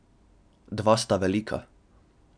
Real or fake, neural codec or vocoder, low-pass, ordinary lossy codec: real; none; 9.9 kHz; none